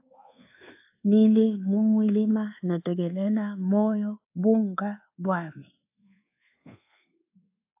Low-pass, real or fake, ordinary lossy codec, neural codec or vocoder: 3.6 kHz; fake; AAC, 32 kbps; codec, 24 kHz, 1.2 kbps, DualCodec